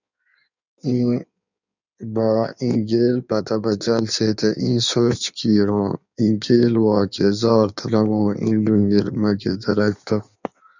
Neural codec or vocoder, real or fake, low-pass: codec, 16 kHz in and 24 kHz out, 1.1 kbps, FireRedTTS-2 codec; fake; 7.2 kHz